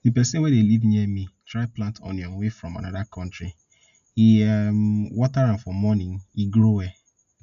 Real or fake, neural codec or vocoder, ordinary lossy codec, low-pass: real; none; none; 7.2 kHz